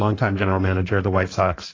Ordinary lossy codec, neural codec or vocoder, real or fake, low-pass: AAC, 32 kbps; codec, 16 kHz in and 24 kHz out, 1.1 kbps, FireRedTTS-2 codec; fake; 7.2 kHz